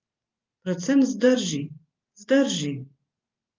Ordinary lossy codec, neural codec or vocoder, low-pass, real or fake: Opus, 24 kbps; vocoder, 44.1 kHz, 128 mel bands every 512 samples, BigVGAN v2; 7.2 kHz; fake